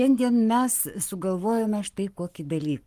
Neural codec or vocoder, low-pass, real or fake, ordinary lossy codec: codec, 44.1 kHz, 7.8 kbps, Pupu-Codec; 14.4 kHz; fake; Opus, 24 kbps